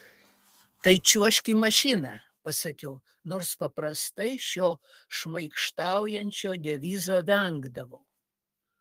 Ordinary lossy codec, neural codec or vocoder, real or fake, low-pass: Opus, 32 kbps; codec, 32 kHz, 1.9 kbps, SNAC; fake; 14.4 kHz